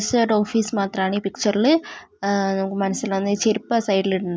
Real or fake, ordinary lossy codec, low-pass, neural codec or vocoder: real; none; none; none